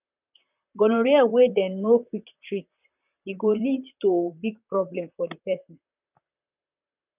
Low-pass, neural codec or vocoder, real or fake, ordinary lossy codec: 3.6 kHz; vocoder, 44.1 kHz, 128 mel bands, Pupu-Vocoder; fake; none